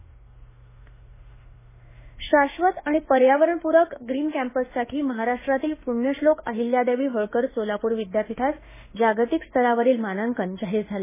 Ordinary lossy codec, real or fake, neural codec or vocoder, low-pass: MP3, 16 kbps; fake; autoencoder, 48 kHz, 32 numbers a frame, DAC-VAE, trained on Japanese speech; 3.6 kHz